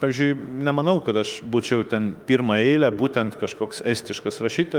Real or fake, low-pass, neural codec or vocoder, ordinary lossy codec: fake; 19.8 kHz; autoencoder, 48 kHz, 32 numbers a frame, DAC-VAE, trained on Japanese speech; Opus, 32 kbps